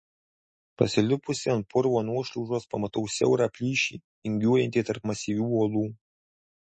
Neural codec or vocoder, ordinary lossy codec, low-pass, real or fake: none; MP3, 32 kbps; 10.8 kHz; real